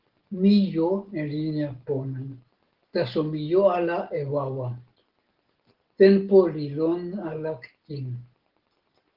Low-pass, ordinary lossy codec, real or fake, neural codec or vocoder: 5.4 kHz; Opus, 16 kbps; real; none